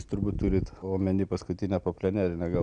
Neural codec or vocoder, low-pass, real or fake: none; 9.9 kHz; real